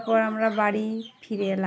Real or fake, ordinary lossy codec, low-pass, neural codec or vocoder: real; none; none; none